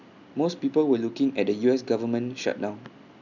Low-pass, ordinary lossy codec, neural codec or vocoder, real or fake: 7.2 kHz; none; none; real